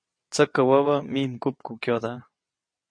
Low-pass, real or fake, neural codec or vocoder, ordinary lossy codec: 9.9 kHz; fake; vocoder, 22.05 kHz, 80 mel bands, WaveNeXt; MP3, 48 kbps